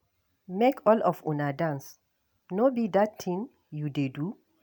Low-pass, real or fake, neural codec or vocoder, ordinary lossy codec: none; real; none; none